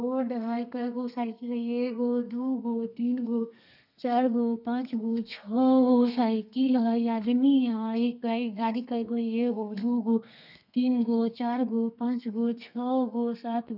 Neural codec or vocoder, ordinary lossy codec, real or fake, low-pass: codec, 32 kHz, 1.9 kbps, SNAC; none; fake; 5.4 kHz